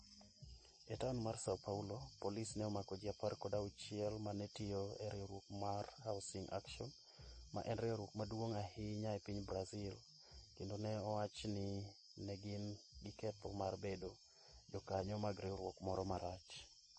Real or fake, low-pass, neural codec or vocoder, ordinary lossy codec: real; 10.8 kHz; none; MP3, 32 kbps